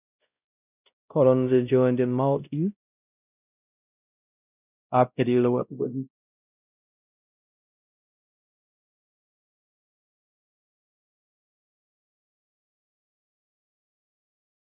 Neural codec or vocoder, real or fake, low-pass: codec, 16 kHz, 0.5 kbps, X-Codec, WavLM features, trained on Multilingual LibriSpeech; fake; 3.6 kHz